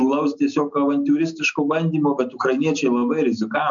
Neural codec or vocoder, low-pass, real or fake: none; 7.2 kHz; real